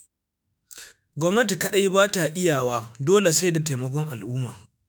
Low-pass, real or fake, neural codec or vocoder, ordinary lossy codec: none; fake; autoencoder, 48 kHz, 32 numbers a frame, DAC-VAE, trained on Japanese speech; none